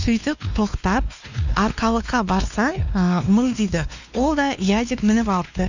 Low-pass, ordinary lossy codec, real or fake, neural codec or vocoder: 7.2 kHz; none; fake; codec, 16 kHz, 2 kbps, X-Codec, WavLM features, trained on Multilingual LibriSpeech